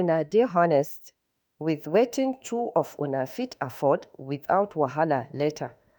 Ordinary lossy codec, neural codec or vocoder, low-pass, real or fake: none; autoencoder, 48 kHz, 32 numbers a frame, DAC-VAE, trained on Japanese speech; none; fake